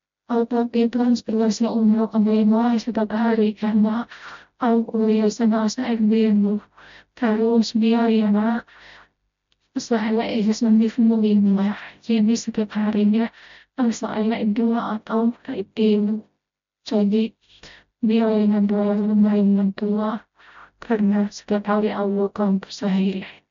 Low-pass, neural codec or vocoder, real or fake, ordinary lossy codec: 7.2 kHz; codec, 16 kHz, 0.5 kbps, FreqCodec, smaller model; fake; MP3, 64 kbps